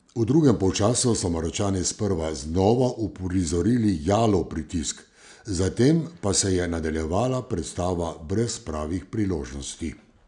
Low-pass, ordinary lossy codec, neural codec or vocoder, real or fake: 9.9 kHz; none; none; real